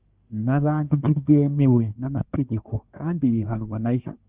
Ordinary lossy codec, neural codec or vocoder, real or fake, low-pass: Opus, 32 kbps; codec, 24 kHz, 1 kbps, SNAC; fake; 3.6 kHz